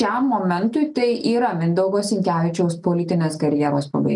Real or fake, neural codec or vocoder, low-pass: real; none; 10.8 kHz